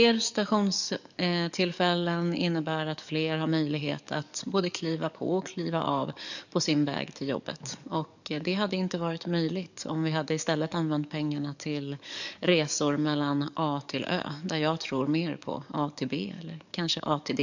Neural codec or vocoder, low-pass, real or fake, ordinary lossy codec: codec, 44.1 kHz, 7.8 kbps, DAC; 7.2 kHz; fake; none